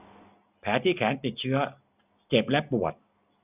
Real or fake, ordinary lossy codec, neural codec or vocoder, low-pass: real; none; none; 3.6 kHz